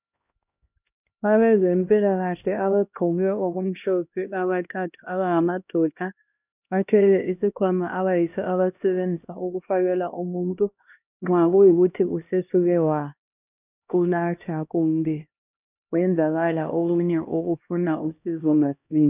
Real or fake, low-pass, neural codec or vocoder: fake; 3.6 kHz; codec, 16 kHz, 1 kbps, X-Codec, HuBERT features, trained on LibriSpeech